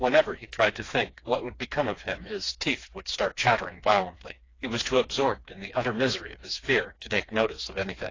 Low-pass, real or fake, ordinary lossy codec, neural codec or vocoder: 7.2 kHz; fake; AAC, 32 kbps; codec, 16 kHz, 2 kbps, FreqCodec, smaller model